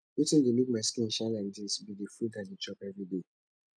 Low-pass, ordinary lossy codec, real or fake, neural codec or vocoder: 9.9 kHz; none; real; none